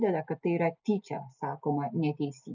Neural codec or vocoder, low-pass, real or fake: none; 7.2 kHz; real